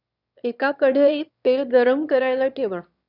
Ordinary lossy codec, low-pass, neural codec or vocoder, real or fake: none; 5.4 kHz; autoencoder, 22.05 kHz, a latent of 192 numbers a frame, VITS, trained on one speaker; fake